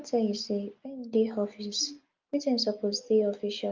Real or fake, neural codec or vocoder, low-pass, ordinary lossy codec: real; none; 7.2 kHz; Opus, 32 kbps